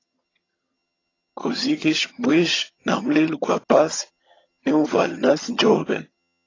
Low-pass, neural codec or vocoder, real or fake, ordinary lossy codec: 7.2 kHz; vocoder, 22.05 kHz, 80 mel bands, HiFi-GAN; fake; AAC, 32 kbps